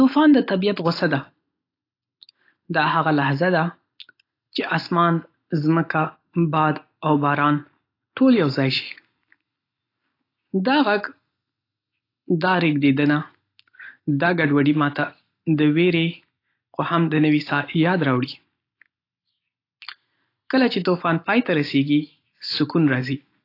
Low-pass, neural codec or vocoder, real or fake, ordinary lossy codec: 5.4 kHz; none; real; AAC, 32 kbps